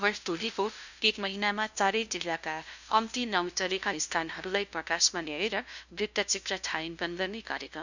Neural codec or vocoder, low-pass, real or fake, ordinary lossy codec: codec, 16 kHz, 0.5 kbps, FunCodec, trained on LibriTTS, 25 frames a second; 7.2 kHz; fake; none